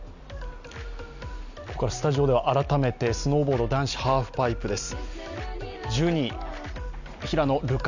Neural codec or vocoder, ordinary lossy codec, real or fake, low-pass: none; none; real; 7.2 kHz